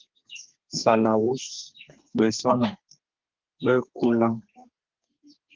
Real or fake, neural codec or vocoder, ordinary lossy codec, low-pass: fake; codec, 32 kHz, 1.9 kbps, SNAC; Opus, 16 kbps; 7.2 kHz